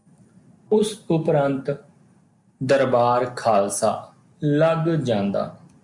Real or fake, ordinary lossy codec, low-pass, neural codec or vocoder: real; MP3, 64 kbps; 10.8 kHz; none